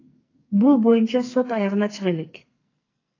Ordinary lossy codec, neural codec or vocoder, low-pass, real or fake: AAC, 32 kbps; codec, 44.1 kHz, 2.6 kbps, SNAC; 7.2 kHz; fake